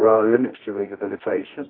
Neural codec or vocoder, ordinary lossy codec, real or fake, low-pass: codec, 24 kHz, 0.9 kbps, WavTokenizer, medium music audio release; AAC, 24 kbps; fake; 5.4 kHz